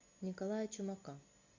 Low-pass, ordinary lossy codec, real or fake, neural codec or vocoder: 7.2 kHz; MP3, 48 kbps; real; none